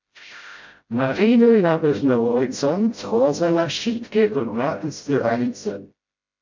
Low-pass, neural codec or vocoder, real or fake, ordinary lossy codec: 7.2 kHz; codec, 16 kHz, 0.5 kbps, FreqCodec, smaller model; fake; MP3, 48 kbps